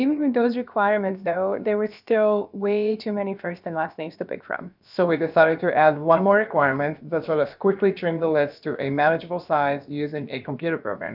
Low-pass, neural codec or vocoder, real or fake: 5.4 kHz; codec, 16 kHz, about 1 kbps, DyCAST, with the encoder's durations; fake